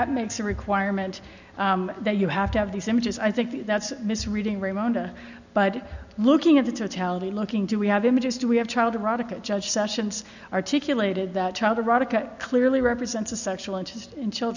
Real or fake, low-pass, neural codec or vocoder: real; 7.2 kHz; none